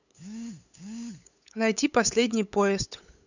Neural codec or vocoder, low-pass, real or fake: codec, 16 kHz, 8 kbps, FunCodec, trained on LibriTTS, 25 frames a second; 7.2 kHz; fake